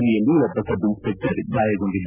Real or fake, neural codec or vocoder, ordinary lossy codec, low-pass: real; none; none; 3.6 kHz